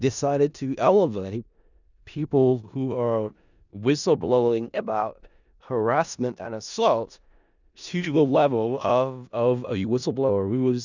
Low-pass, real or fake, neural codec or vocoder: 7.2 kHz; fake; codec, 16 kHz in and 24 kHz out, 0.4 kbps, LongCat-Audio-Codec, four codebook decoder